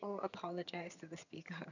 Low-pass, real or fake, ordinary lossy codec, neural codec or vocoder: 7.2 kHz; fake; none; vocoder, 22.05 kHz, 80 mel bands, HiFi-GAN